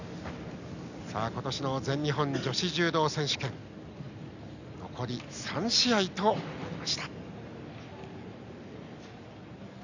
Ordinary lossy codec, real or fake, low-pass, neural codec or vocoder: none; real; 7.2 kHz; none